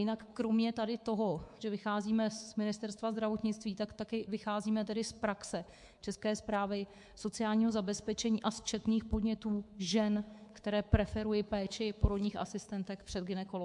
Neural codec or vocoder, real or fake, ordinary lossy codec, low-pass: codec, 24 kHz, 3.1 kbps, DualCodec; fake; MP3, 64 kbps; 10.8 kHz